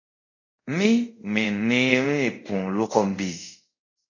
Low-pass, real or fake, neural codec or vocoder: 7.2 kHz; fake; codec, 24 kHz, 0.5 kbps, DualCodec